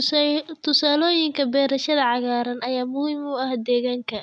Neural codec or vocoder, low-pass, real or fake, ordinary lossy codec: none; 9.9 kHz; real; none